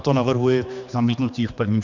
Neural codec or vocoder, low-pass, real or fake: codec, 16 kHz, 2 kbps, X-Codec, HuBERT features, trained on general audio; 7.2 kHz; fake